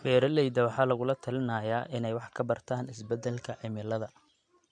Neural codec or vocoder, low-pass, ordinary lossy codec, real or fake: none; 9.9 kHz; MP3, 64 kbps; real